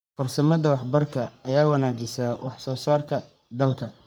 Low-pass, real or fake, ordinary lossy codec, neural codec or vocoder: none; fake; none; codec, 44.1 kHz, 3.4 kbps, Pupu-Codec